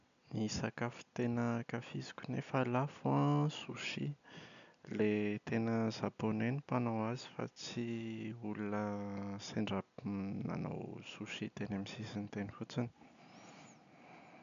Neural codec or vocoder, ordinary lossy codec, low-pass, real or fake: none; none; 7.2 kHz; real